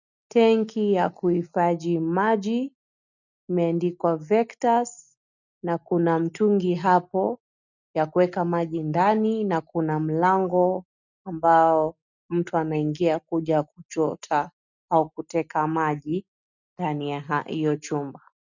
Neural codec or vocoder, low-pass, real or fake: none; 7.2 kHz; real